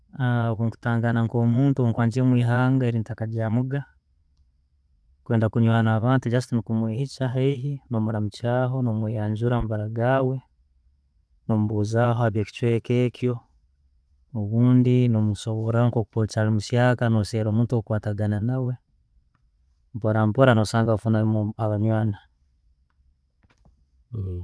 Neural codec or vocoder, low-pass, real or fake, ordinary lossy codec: vocoder, 22.05 kHz, 80 mel bands, Vocos; none; fake; none